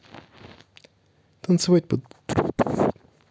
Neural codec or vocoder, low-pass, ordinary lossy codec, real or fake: none; none; none; real